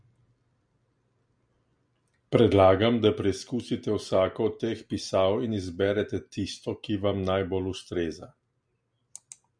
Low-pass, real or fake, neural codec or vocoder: 9.9 kHz; real; none